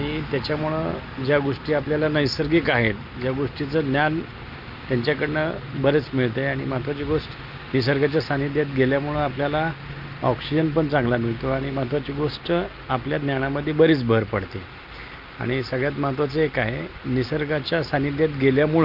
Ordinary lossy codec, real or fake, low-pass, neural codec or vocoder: Opus, 24 kbps; real; 5.4 kHz; none